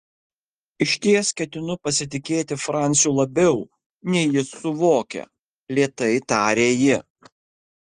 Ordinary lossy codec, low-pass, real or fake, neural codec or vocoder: Opus, 24 kbps; 9.9 kHz; real; none